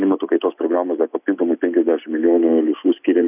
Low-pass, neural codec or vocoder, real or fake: 3.6 kHz; none; real